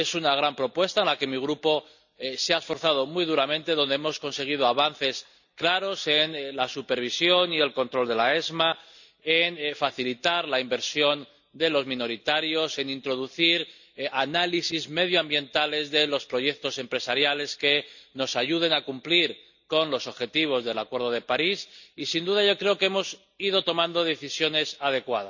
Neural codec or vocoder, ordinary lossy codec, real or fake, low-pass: none; none; real; 7.2 kHz